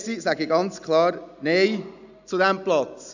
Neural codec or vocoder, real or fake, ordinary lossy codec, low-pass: none; real; none; 7.2 kHz